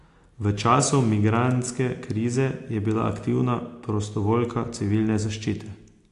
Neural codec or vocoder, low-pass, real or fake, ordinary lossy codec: none; 10.8 kHz; real; AAC, 48 kbps